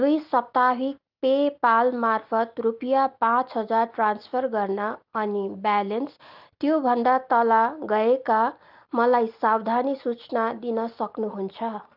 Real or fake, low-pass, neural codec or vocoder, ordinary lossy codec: real; 5.4 kHz; none; Opus, 32 kbps